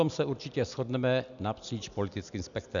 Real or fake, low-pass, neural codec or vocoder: real; 7.2 kHz; none